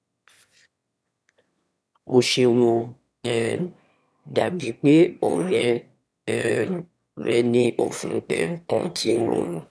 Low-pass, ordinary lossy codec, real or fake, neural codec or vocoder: none; none; fake; autoencoder, 22.05 kHz, a latent of 192 numbers a frame, VITS, trained on one speaker